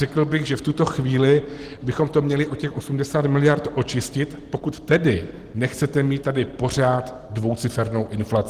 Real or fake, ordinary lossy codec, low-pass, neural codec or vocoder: real; Opus, 16 kbps; 14.4 kHz; none